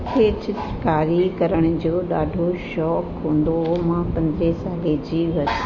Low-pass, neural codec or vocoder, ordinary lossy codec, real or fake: 7.2 kHz; none; MP3, 32 kbps; real